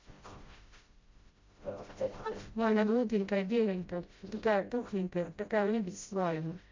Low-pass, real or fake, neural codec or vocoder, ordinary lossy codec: 7.2 kHz; fake; codec, 16 kHz, 0.5 kbps, FreqCodec, smaller model; MP3, 48 kbps